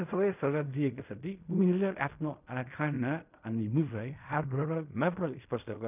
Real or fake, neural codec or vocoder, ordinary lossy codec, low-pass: fake; codec, 16 kHz in and 24 kHz out, 0.4 kbps, LongCat-Audio-Codec, fine tuned four codebook decoder; none; 3.6 kHz